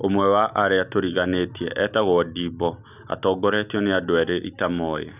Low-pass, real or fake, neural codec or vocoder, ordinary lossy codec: 3.6 kHz; real; none; none